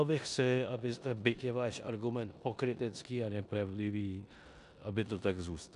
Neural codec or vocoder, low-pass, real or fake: codec, 16 kHz in and 24 kHz out, 0.9 kbps, LongCat-Audio-Codec, four codebook decoder; 10.8 kHz; fake